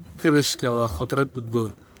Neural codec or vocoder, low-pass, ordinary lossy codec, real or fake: codec, 44.1 kHz, 1.7 kbps, Pupu-Codec; none; none; fake